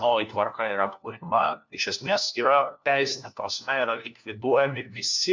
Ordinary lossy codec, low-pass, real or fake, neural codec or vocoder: MP3, 64 kbps; 7.2 kHz; fake; codec, 16 kHz, 1 kbps, FunCodec, trained on LibriTTS, 50 frames a second